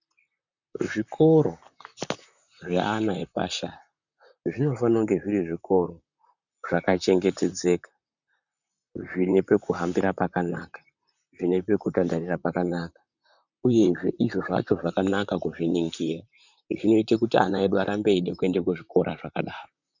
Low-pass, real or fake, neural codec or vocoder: 7.2 kHz; fake; vocoder, 44.1 kHz, 128 mel bands, Pupu-Vocoder